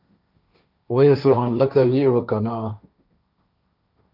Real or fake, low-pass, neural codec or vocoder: fake; 5.4 kHz; codec, 16 kHz, 1.1 kbps, Voila-Tokenizer